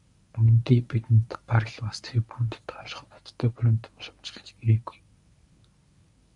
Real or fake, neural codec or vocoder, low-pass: fake; codec, 24 kHz, 0.9 kbps, WavTokenizer, medium speech release version 1; 10.8 kHz